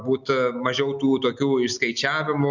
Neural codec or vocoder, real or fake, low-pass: none; real; 7.2 kHz